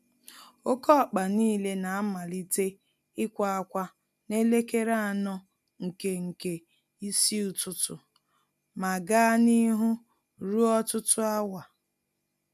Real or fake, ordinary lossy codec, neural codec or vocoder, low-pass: real; none; none; 14.4 kHz